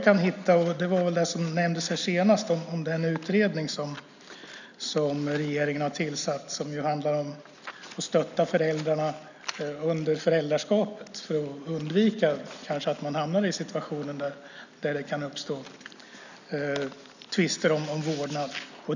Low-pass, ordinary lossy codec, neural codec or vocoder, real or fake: 7.2 kHz; none; none; real